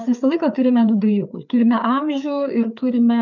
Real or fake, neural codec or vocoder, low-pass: fake; codec, 16 kHz, 4 kbps, FreqCodec, larger model; 7.2 kHz